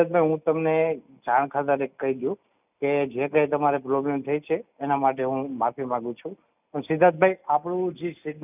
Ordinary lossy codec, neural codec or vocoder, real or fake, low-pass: none; none; real; 3.6 kHz